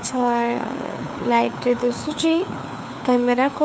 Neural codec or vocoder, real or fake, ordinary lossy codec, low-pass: codec, 16 kHz, 4 kbps, FunCodec, trained on LibriTTS, 50 frames a second; fake; none; none